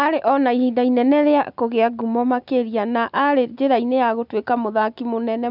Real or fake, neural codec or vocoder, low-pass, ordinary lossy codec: real; none; 5.4 kHz; none